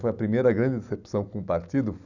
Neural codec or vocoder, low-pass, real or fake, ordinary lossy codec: none; 7.2 kHz; real; none